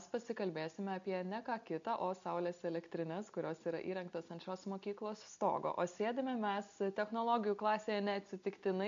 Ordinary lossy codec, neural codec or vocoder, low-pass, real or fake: AAC, 64 kbps; none; 7.2 kHz; real